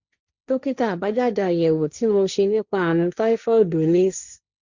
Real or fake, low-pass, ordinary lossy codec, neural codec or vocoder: fake; 7.2 kHz; Opus, 64 kbps; codec, 16 kHz, 1.1 kbps, Voila-Tokenizer